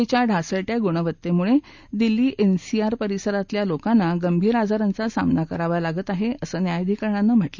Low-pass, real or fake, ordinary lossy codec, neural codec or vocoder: 7.2 kHz; real; Opus, 64 kbps; none